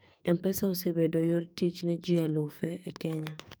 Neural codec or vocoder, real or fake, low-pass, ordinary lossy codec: codec, 44.1 kHz, 2.6 kbps, SNAC; fake; none; none